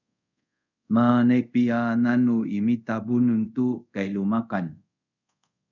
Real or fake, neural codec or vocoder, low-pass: fake; codec, 24 kHz, 0.5 kbps, DualCodec; 7.2 kHz